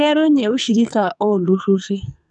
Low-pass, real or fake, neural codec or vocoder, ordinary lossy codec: 10.8 kHz; fake; codec, 44.1 kHz, 2.6 kbps, SNAC; none